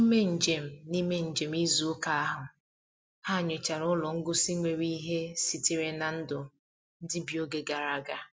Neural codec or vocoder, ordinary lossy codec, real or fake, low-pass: none; none; real; none